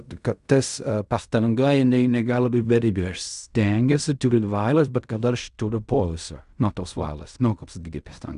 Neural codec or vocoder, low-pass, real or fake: codec, 16 kHz in and 24 kHz out, 0.4 kbps, LongCat-Audio-Codec, fine tuned four codebook decoder; 10.8 kHz; fake